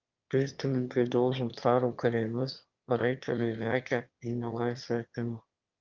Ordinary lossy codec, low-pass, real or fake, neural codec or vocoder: Opus, 24 kbps; 7.2 kHz; fake; autoencoder, 22.05 kHz, a latent of 192 numbers a frame, VITS, trained on one speaker